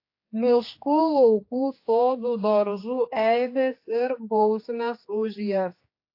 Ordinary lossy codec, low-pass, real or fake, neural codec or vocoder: AAC, 32 kbps; 5.4 kHz; fake; codec, 16 kHz, 2 kbps, X-Codec, HuBERT features, trained on general audio